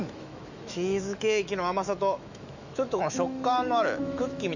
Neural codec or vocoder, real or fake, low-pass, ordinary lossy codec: autoencoder, 48 kHz, 128 numbers a frame, DAC-VAE, trained on Japanese speech; fake; 7.2 kHz; none